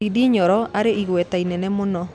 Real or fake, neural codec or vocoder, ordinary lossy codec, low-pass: real; none; none; none